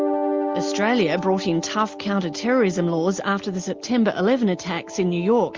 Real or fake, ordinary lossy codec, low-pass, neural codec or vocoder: real; Opus, 32 kbps; 7.2 kHz; none